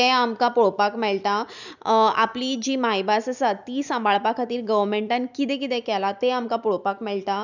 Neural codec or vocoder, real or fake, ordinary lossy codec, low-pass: none; real; none; 7.2 kHz